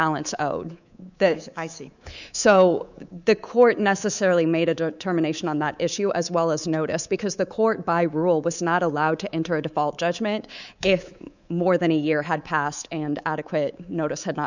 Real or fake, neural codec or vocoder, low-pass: fake; codec, 24 kHz, 3.1 kbps, DualCodec; 7.2 kHz